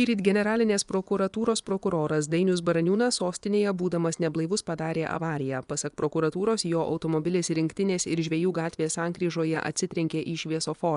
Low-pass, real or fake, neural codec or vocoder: 10.8 kHz; fake; vocoder, 24 kHz, 100 mel bands, Vocos